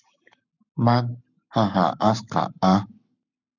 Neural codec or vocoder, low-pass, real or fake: codec, 44.1 kHz, 7.8 kbps, Pupu-Codec; 7.2 kHz; fake